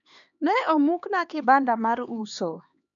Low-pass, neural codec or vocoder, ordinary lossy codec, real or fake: 7.2 kHz; codec, 16 kHz, 2 kbps, X-Codec, HuBERT features, trained on LibriSpeech; none; fake